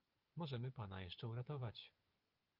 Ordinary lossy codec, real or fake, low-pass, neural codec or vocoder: Opus, 32 kbps; fake; 5.4 kHz; vocoder, 24 kHz, 100 mel bands, Vocos